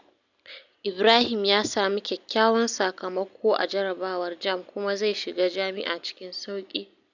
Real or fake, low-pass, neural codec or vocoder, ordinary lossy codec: real; 7.2 kHz; none; none